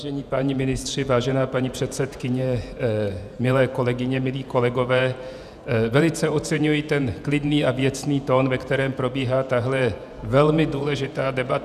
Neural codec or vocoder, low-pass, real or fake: vocoder, 48 kHz, 128 mel bands, Vocos; 14.4 kHz; fake